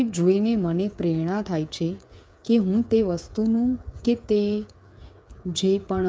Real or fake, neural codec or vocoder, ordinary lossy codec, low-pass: fake; codec, 16 kHz, 8 kbps, FreqCodec, smaller model; none; none